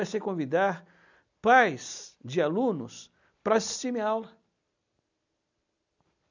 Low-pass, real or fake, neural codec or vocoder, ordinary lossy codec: 7.2 kHz; real; none; none